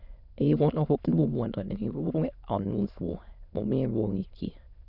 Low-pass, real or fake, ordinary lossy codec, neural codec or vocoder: 5.4 kHz; fake; none; autoencoder, 22.05 kHz, a latent of 192 numbers a frame, VITS, trained on many speakers